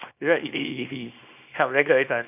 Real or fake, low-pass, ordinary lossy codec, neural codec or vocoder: fake; 3.6 kHz; none; codec, 24 kHz, 0.9 kbps, WavTokenizer, small release